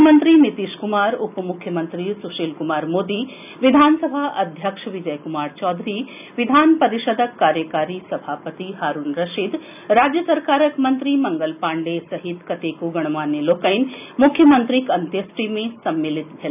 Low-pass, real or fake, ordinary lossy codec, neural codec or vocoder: 3.6 kHz; real; none; none